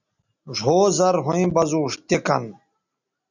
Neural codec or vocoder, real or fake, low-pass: none; real; 7.2 kHz